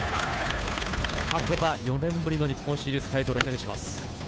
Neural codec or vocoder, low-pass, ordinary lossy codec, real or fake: codec, 16 kHz, 2 kbps, FunCodec, trained on Chinese and English, 25 frames a second; none; none; fake